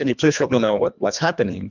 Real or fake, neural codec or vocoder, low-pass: fake; codec, 24 kHz, 1.5 kbps, HILCodec; 7.2 kHz